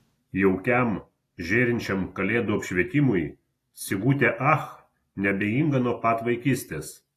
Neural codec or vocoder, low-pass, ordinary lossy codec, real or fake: none; 14.4 kHz; AAC, 48 kbps; real